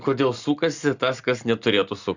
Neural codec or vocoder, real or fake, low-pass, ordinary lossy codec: none; real; 7.2 kHz; Opus, 64 kbps